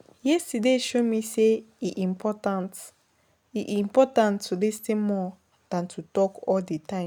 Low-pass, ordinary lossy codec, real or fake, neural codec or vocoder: none; none; real; none